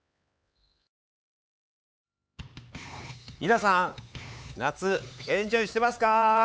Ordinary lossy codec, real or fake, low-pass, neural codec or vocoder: none; fake; none; codec, 16 kHz, 4 kbps, X-Codec, HuBERT features, trained on LibriSpeech